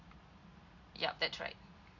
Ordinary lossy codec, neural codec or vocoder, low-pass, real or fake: AAC, 48 kbps; none; 7.2 kHz; real